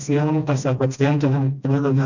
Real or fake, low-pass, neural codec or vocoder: fake; 7.2 kHz; codec, 16 kHz, 1 kbps, FreqCodec, smaller model